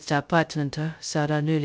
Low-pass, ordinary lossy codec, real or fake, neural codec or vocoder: none; none; fake; codec, 16 kHz, 0.2 kbps, FocalCodec